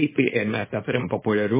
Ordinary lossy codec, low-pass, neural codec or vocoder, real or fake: MP3, 16 kbps; 3.6 kHz; codec, 24 kHz, 0.9 kbps, WavTokenizer, small release; fake